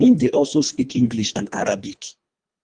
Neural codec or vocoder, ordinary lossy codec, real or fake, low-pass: codec, 24 kHz, 1.5 kbps, HILCodec; Opus, 32 kbps; fake; 9.9 kHz